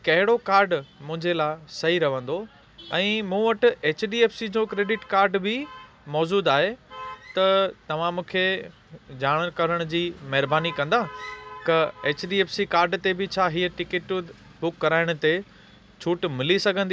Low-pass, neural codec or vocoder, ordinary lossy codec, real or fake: none; none; none; real